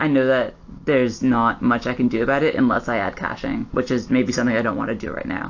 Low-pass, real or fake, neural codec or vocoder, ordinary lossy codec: 7.2 kHz; real; none; AAC, 48 kbps